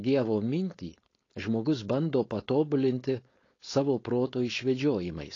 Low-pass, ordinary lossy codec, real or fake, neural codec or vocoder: 7.2 kHz; AAC, 32 kbps; fake; codec, 16 kHz, 4.8 kbps, FACodec